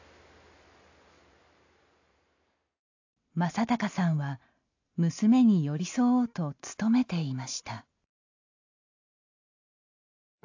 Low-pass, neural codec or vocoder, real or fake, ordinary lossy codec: 7.2 kHz; none; real; AAC, 48 kbps